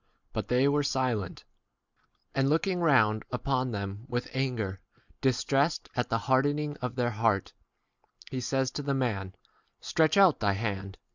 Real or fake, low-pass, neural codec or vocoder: real; 7.2 kHz; none